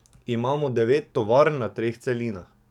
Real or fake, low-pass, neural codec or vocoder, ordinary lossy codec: fake; 19.8 kHz; codec, 44.1 kHz, 7.8 kbps, DAC; none